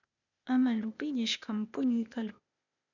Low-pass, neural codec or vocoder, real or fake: 7.2 kHz; codec, 16 kHz, 0.8 kbps, ZipCodec; fake